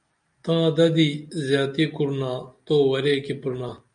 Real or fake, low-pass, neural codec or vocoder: real; 9.9 kHz; none